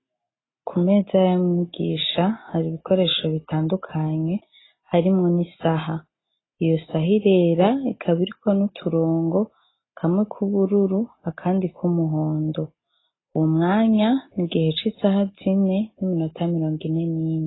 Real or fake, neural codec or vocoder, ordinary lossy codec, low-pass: real; none; AAC, 16 kbps; 7.2 kHz